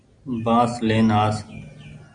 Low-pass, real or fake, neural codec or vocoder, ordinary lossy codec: 9.9 kHz; real; none; Opus, 64 kbps